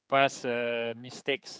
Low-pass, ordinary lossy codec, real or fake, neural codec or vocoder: none; none; fake; codec, 16 kHz, 4 kbps, X-Codec, HuBERT features, trained on general audio